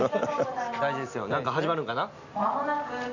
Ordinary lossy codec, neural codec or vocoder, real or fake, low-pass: none; none; real; 7.2 kHz